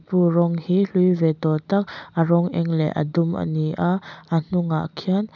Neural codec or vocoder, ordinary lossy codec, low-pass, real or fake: none; none; 7.2 kHz; real